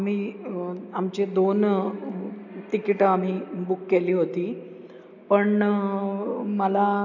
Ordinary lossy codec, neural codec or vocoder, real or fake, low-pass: none; none; real; 7.2 kHz